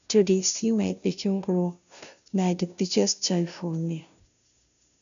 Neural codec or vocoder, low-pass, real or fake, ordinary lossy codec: codec, 16 kHz, 0.5 kbps, FunCodec, trained on Chinese and English, 25 frames a second; 7.2 kHz; fake; none